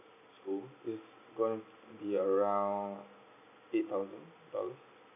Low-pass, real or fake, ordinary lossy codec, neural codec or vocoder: 3.6 kHz; real; none; none